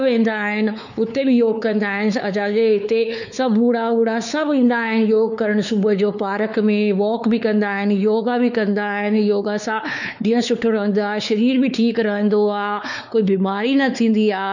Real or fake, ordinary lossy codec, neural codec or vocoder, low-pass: fake; none; codec, 16 kHz, 4 kbps, X-Codec, WavLM features, trained on Multilingual LibriSpeech; 7.2 kHz